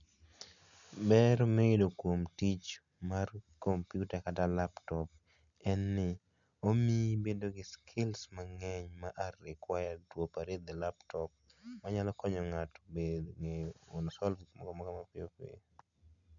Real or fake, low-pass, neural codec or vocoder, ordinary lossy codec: real; 7.2 kHz; none; none